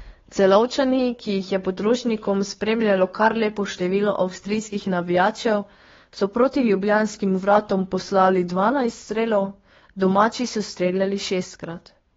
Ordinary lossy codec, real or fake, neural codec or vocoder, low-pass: AAC, 24 kbps; fake; codec, 16 kHz, 2 kbps, FunCodec, trained on Chinese and English, 25 frames a second; 7.2 kHz